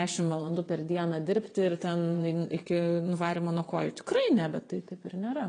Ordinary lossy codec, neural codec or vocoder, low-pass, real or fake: AAC, 32 kbps; vocoder, 22.05 kHz, 80 mel bands, WaveNeXt; 9.9 kHz; fake